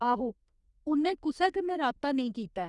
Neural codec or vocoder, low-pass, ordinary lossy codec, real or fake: codec, 32 kHz, 1.9 kbps, SNAC; 10.8 kHz; Opus, 64 kbps; fake